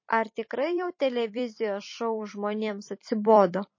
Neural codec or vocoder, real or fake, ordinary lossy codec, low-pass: vocoder, 44.1 kHz, 128 mel bands every 256 samples, BigVGAN v2; fake; MP3, 32 kbps; 7.2 kHz